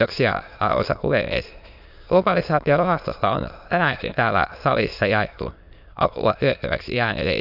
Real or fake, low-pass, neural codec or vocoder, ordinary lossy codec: fake; 5.4 kHz; autoencoder, 22.05 kHz, a latent of 192 numbers a frame, VITS, trained on many speakers; none